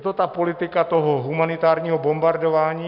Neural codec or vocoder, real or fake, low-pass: none; real; 5.4 kHz